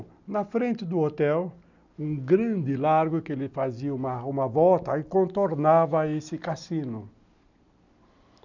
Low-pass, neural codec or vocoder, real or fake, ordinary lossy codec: 7.2 kHz; none; real; none